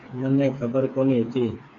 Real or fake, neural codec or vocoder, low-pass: fake; codec, 16 kHz, 4 kbps, FreqCodec, smaller model; 7.2 kHz